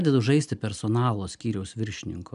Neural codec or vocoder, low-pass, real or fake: none; 10.8 kHz; real